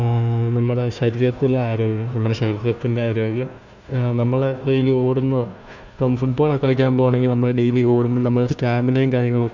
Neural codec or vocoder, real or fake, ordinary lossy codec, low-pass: codec, 16 kHz, 1 kbps, FunCodec, trained on Chinese and English, 50 frames a second; fake; none; 7.2 kHz